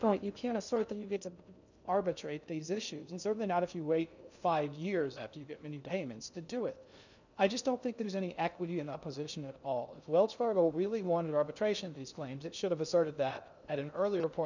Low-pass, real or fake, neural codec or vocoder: 7.2 kHz; fake; codec, 16 kHz in and 24 kHz out, 0.6 kbps, FocalCodec, streaming, 2048 codes